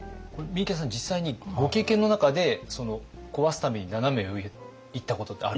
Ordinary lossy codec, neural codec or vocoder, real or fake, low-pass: none; none; real; none